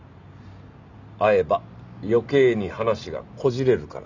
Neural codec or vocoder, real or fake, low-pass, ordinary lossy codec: none; real; 7.2 kHz; none